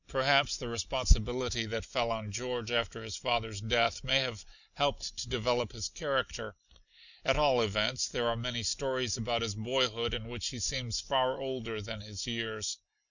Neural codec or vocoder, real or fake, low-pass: none; real; 7.2 kHz